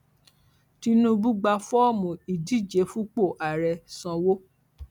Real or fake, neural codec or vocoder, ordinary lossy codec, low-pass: real; none; none; 19.8 kHz